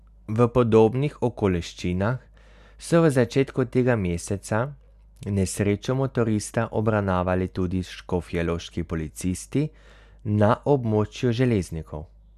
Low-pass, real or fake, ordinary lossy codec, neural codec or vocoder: 14.4 kHz; real; none; none